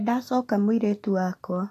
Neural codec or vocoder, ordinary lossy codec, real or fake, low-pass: none; AAC, 48 kbps; real; 14.4 kHz